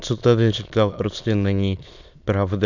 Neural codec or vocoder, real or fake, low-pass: autoencoder, 22.05 kHz, a latent of 192 numbers a frame, VITS, trained on many speakers; fake; 7.2 kHz